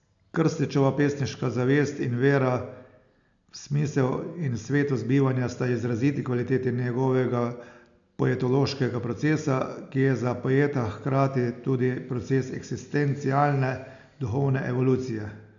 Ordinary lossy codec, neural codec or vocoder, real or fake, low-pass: none; none; real; 7.2 kHz